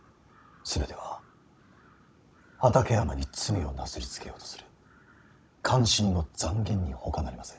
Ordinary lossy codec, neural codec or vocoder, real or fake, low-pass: none; codec, 16 kHz, 16 kbps, FunCodec, trained on Chinese and English, 50 frames a second; fake; none